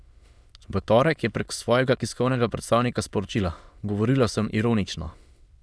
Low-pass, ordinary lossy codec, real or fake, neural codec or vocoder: none; none; fake; autoencoder, 22.05 kHz, a latent of 192 numbers a frame, VITS, trained on many speakers